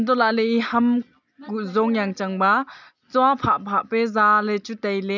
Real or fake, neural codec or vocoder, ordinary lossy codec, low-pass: real; none; none; 7.2 kHz